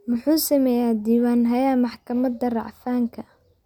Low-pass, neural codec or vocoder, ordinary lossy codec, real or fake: 19.8 kHz; none; none; real